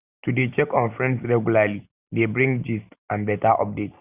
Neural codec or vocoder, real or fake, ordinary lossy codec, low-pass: none; real; Opus, 64 kbps; 3.6 kHz